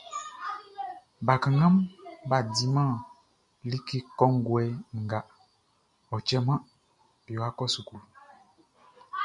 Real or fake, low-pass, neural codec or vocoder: real; 10.8 kHz; none